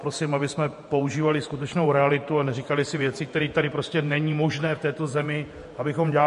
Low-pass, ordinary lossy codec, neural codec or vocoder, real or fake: 14.4 kHz; MP3, 48 kbps; vocoder, 48 kHz, 128 mel bands, Vocos; fake